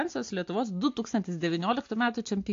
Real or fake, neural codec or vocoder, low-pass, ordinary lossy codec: real; none; 7.2 kHz; AAC, 48 kbps